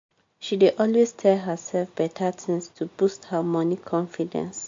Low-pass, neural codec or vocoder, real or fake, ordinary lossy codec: 7.2 kHz; none; real; MP3, 48 kbps